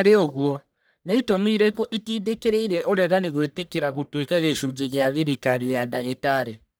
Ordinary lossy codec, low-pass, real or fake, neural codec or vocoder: none; none; fake; codec, 44.1 kHz, 1.7 kbps, Pupu-Codec